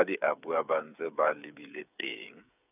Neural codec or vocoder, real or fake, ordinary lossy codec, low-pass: codec, 16 kHz, 8 kbps, FreqCodec, smaller model; fake; none; 3.6 kHz